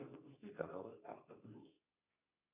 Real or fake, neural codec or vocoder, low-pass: fake; codec, 24 kHz, 0.9 kbps, WavTokenizer, medium speech release version 2; 3.6 kHz